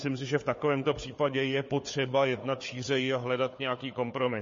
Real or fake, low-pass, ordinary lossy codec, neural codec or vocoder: fake; 7.2 kHz; MP3, 32 kbps; codec, 16 kHz, 4 kbps, FunCodec, trained on Chinese and English, 50 frames a second